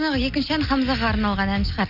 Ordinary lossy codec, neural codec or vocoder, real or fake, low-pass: none; none; real; 5.4 kHz